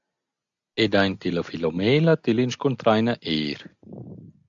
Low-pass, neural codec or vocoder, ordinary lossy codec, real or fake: 7.2 kHz; none; AAC, 64 kbps; real